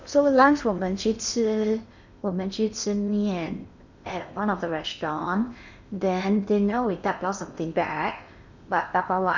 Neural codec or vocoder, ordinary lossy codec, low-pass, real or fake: codec, 16 kHz in and 24 kHz out, 0.8 kbps, FocalCodec, streaming, 65536 codes; none; 7.2 kHz; fake